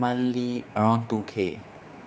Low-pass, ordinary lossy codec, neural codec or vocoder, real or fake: none; none; codec, 16 kHz, 4 kbps, X-Codec, HuBERT features, trained on general audio; fake